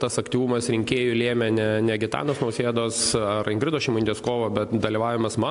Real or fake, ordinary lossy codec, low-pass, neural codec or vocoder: real; AAC, 64 kbps; 10.8 kHz; none